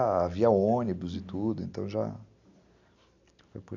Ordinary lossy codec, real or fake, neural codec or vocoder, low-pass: none; real; none; 7.2 kHz